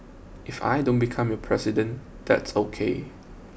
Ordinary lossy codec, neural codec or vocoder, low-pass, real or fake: none; none; none; real